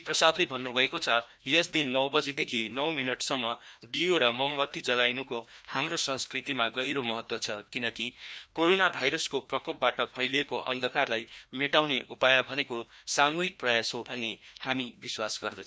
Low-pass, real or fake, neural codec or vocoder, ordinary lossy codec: none; fake; codec, 16 kHz, 1 kbps, FreqCodec, larger model; none